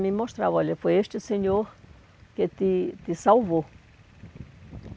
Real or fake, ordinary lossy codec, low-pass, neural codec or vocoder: real; none; none; none